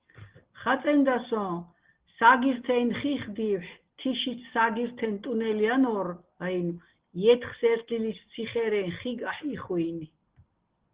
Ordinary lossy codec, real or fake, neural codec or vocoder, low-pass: Opus, 16 kbps; real; none; 3.6 kHz